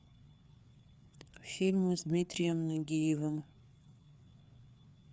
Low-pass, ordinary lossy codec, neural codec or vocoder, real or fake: none; none; codec, 16 kHz, 4 kbps, FreqCodec, larger model; fake